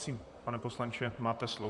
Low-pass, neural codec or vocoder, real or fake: 10.8 kHz; vocoder, 44.1 kHz, 128 mel bands, Pupu-Vocoder; fake